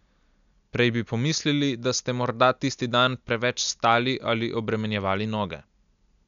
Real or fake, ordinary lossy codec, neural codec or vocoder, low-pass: real; none; none; 7.2 kHz